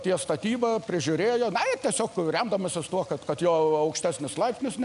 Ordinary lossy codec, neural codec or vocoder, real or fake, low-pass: MP3, 96 kbps; none; real; 10.8 kHz